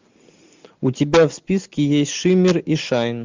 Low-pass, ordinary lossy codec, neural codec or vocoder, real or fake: 7.2 kHz; MP3, 64 kbps; none; real